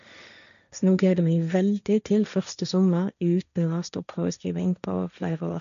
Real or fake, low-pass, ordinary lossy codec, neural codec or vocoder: fake; 7.2 kHz; none; codec, 16 kHz, 1.1 kbps, Voila-Tokenizer